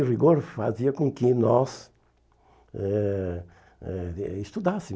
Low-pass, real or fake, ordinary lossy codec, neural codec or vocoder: none; real; none; none